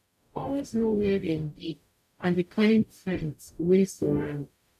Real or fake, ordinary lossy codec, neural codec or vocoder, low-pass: fake; none; codec, 44.1 kHz, 0.9 kbps, DAC; 14.4 kHz